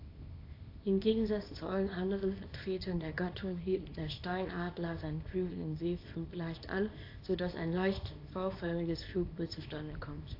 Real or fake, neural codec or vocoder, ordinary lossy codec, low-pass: fake; codec, 24 kHz, 0.9 kbps, WavTokenizer, small release; AAC, 32 kbps; 5.4 kHz